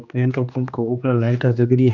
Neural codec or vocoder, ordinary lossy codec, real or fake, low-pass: codec, 16 kHz, 2 kbps, X-Codec, HuBERT features, trained on general audio; none; fake; 7.2 kHz